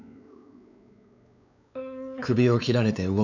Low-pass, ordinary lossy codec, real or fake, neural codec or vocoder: 7.2 kHz; none; fake; codec, 16 kHz, 4 kbps, X-Codec, WavLM features, trained on Multilingual LibriSpeech